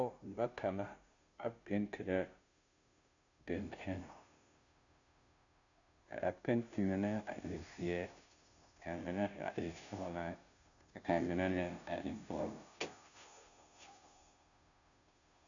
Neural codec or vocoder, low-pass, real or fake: codec, 16 kHz, 0.5 kbps, FunCodec, trained on Chinese and English, 25 frames a second; 7.2 kHz; fake